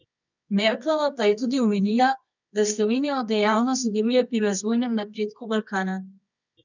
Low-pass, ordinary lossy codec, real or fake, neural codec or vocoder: 7.2 kHz; none; fake; codec, 24 kHz, 0.9 kbps, WavTokenizer, medium music audio release